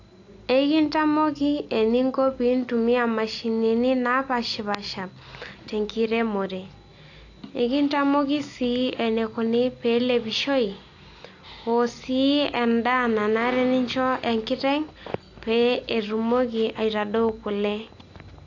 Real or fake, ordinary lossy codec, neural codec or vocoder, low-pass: real; AAC, 48 kbps; none; 7.2 kHz